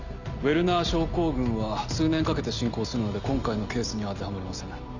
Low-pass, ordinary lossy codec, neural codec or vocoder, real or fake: 7.2 kHz; none; none; real